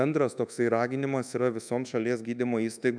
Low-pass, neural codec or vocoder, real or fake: 9.9 kHz; codec, 24 kHz, 1.2 kbps, DualCodec; fake